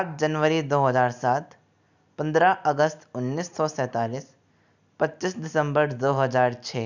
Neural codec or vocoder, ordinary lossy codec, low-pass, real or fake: none; none; 7.2 kHz; real